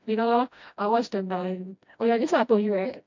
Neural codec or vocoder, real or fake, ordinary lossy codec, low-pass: codec, 16 kHz, 1 kbps, FreqCodec, smaller model; fake; MP3, 48 kbps; 7.2 kHz